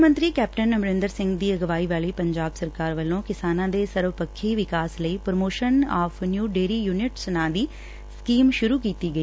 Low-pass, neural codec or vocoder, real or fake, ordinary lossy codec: none; none; real; none